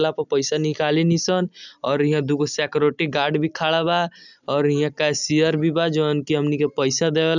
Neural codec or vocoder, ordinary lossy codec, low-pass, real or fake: none; none; 7.2 kHz; real